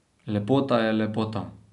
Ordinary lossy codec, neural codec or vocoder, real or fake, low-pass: none; none; real; 10.8 kHz